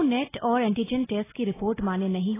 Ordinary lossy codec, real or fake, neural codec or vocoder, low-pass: AAC, 16 kbps; real; none; 3.6 kHz